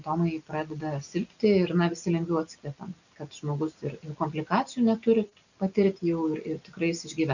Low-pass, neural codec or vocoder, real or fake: 7.2 kHz; none; real